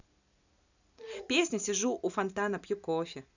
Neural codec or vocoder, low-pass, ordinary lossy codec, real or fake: none; 7.2 kHz; none; real